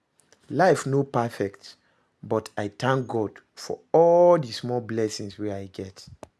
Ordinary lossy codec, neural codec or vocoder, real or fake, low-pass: none; none; real; none